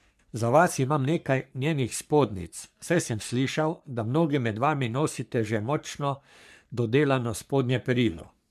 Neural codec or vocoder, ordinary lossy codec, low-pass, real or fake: codec, 44.1 kHz, 3.4 kbps, Pupu-Codec; MP3, 96 kbps; 14.4 kHz; fake